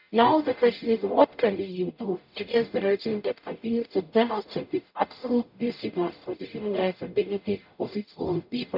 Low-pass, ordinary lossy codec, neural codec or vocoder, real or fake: 5.4 kHz; none; codec, 44.1 kHz, 0.9 kbps, DAC; fake